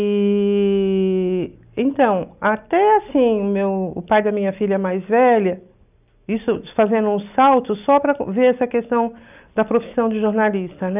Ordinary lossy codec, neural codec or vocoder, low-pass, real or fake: none; none; 3.6 kHz; real